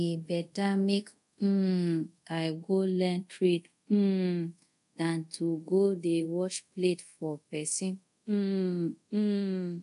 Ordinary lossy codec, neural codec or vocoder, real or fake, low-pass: none; codec, 24 kHz, 0.5 kbps, DualCodec; fake; 10.8 kHz